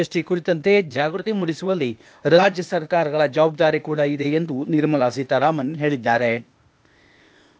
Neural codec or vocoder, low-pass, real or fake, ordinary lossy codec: codec, 16 kHz, 0.8 kbps, ZipCodec; none; fake; none